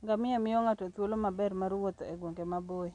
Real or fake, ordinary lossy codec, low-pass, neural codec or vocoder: real; none; 9.9 kHz; none